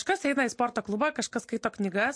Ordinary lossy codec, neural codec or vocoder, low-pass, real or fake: MP3, 48 kbps; none; 9.9 kHz; real